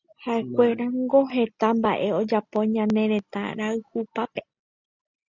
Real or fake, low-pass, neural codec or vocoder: real; 7.2 kHz; none